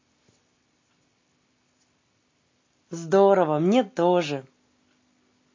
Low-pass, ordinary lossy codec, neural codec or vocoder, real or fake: 7.2 kHz; MP3, 32 kbps; none; real